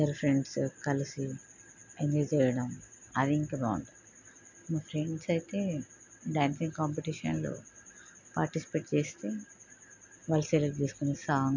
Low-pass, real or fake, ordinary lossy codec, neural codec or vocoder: 7.2 kHz; real; none; none